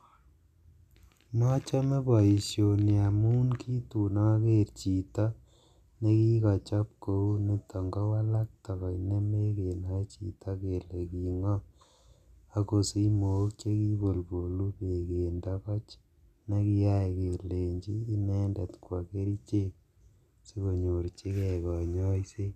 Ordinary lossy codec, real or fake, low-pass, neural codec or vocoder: none; real; 14.4 kHz; none